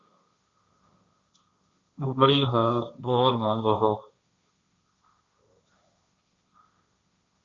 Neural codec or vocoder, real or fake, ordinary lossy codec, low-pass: codec, 16 kHz, 1.1 kbps, Voila-Tokenizer; fake; Opus, 64 kbps; 7.2 kHz